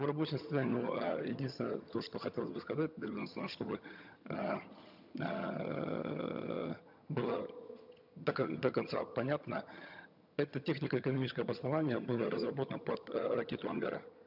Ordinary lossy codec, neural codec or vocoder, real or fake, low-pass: none; vocoder, 22.05 kHz, 80 mel bands, HiFi-GAN; fake; 5.4 kHz